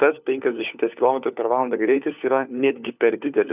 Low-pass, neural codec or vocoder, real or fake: 3.6 kHz; codec, 16 kHz, 4 kbps, FunCodec, trained on LibriTTS, 50 frames a second; fake